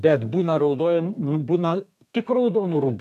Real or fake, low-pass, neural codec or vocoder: fake; 14.4 kHz; codec, 32 kHz, 1.9 kbps, SNAC